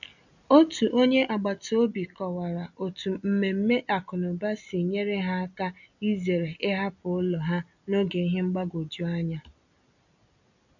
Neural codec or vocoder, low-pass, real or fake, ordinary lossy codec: none; 7.2 kHz; real; none